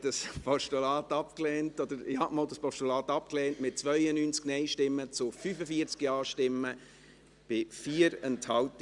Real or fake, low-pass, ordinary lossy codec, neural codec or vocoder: real; 10.8 kHz; Opus, 64 kbps; none